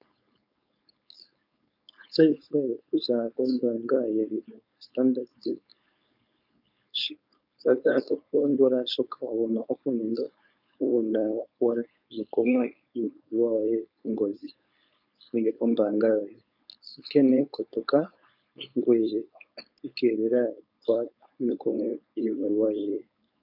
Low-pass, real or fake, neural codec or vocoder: 5.4 kHz; fake; codec, 16 kHz, 4.8 kbps, FACodec